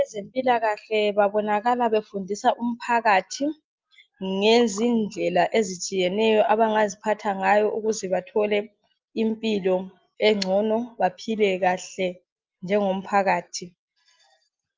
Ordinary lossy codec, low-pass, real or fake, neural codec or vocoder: Opus, 24 kbps; 7.2 kHz; real; none